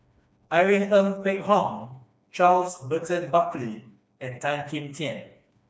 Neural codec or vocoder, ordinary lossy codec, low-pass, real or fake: codec, 16 kHz, 2 kbps, FreqCodec, smaller model; none; none; fake